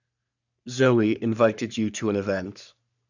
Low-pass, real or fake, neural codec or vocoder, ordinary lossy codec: 7.2 kHz; fake; codec, 44.1 kHz, 3.4 kbps, Pupu-Codec; none